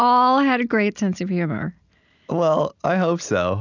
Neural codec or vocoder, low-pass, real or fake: none; 7.2 kHz; real